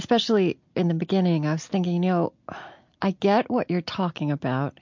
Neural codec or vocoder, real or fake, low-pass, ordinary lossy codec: none; real; 7.2 kHz; MP3, 48 kbps